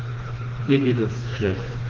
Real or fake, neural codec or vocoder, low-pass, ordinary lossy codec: fake; codec, 16 kHz, 2 kbps, FreqCodec, smaller model; 7.2 kHz; Opus, 16 kbps